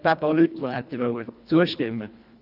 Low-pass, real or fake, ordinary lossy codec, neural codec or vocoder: 5.4 kHz; fake; none; codec, 24 kHz, 1.5 kbps, HILCodec